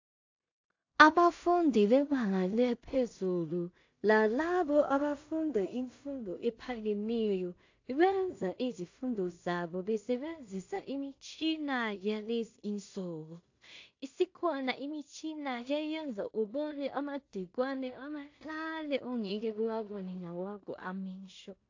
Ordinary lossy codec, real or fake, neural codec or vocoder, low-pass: AAC, 48 kbps; fake; codec, 16 kHz in and 24 kHz out, 0.4 kbps, LongCat-Audio-Codec, two codebook decoder; 7.2 kHz